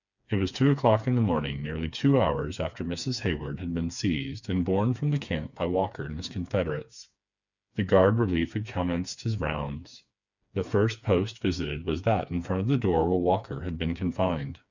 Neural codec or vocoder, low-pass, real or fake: codec, 16 kHz, 4 kbps, FreqCodec, smaller model; 7.2 kHz; fake